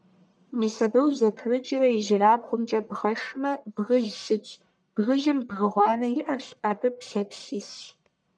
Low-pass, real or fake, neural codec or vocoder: 9.9 kHz; fake; codec, 44.1 kHz, 1.7 kbps, Pupu-Codec